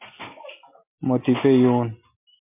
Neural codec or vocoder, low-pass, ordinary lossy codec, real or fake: none; 3.6 kHz; MP3, 24 kbps; real